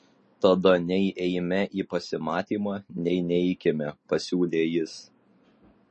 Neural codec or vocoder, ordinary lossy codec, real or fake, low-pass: none; MP3, 32 kbps; real; 10.8 kHz